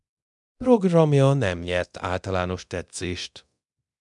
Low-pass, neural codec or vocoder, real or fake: 10.8 kHz; codec, 24 kHz, 0.9 kbps, DualCodec; fake